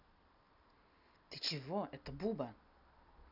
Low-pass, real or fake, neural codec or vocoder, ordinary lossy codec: 5.4 kHz; real; none; none